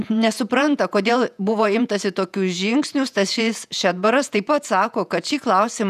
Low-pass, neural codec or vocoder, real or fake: 14.4 kHz; vocoder, 48 kHz, 128 mel bands, Vocos; fake